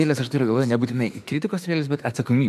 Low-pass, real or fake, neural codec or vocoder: 14.4 kHz; fake; autoencoder, 48 kHz, 32 numbers a frame, DAC-VAE, trained on Japanese speech